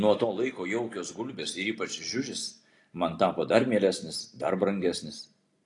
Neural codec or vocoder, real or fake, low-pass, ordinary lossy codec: none; real; 10.8 kHz; AAC, 48 kbps